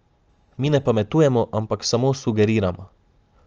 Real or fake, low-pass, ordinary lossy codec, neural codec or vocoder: real; 7.2 kHz; Opus, 24 kbps; none